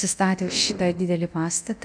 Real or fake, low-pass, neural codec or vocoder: fake; 9.9 kHz; codec, 24 kHz, 0.9 kbps, DualCodec